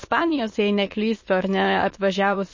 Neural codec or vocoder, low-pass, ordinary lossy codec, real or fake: autoencoder, 22.05 kHz, a latent of 192 numbers a frame, VITS, trained on many speakers; 7.2 kHz; MP3, 32 kbps; fake